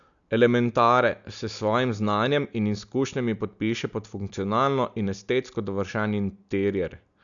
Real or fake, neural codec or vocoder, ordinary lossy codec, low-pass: real; none; MP3, 96 kbps; 7.2 kHz